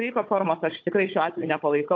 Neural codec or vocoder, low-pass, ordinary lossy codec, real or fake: codec, 16 kHz, 16 kbps, FunCodec, trained on Chinese and English, 50 frames a second; 7.2 kHz; AAC, 48 kbps; fake